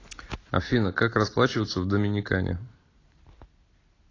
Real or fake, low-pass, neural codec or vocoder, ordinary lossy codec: real; 7.2 kHz; none; AAC, 32 kbps